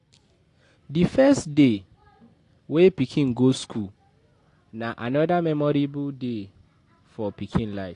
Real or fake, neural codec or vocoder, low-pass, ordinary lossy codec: real; none; 10.8 kHz; AAC, 48 kbps